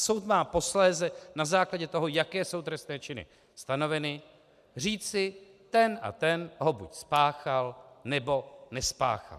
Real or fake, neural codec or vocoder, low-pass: real; none; 14.4 kHz